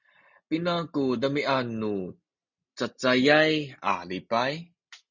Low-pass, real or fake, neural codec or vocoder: 7.2 kHz; real; none